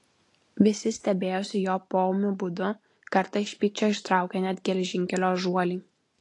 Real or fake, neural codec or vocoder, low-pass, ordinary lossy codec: real; none; 10.8 kHz; AAC, 48 kbps